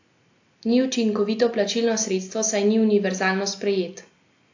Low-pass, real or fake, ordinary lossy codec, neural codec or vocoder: 7.2 kHz; real; AAC, 48 kbps; none